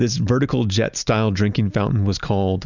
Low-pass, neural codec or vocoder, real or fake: 7.2 kHz; none; real